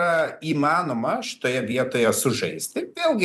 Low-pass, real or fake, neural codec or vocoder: 14.4 kHz; fake; vocoder, 44.1 kHz, 128 mel bands every 256 samples, BigVGAN v2